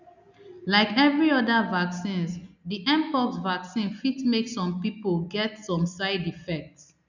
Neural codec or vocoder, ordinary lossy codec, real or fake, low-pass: none; none; real; 7.2 kHz